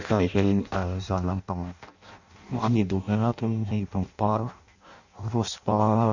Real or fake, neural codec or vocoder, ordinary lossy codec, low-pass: fake; codec, 16 kHz in and 24 kHz out, 0.6 kbps, FireRedTTS-2 codec; none; 7.2 kHz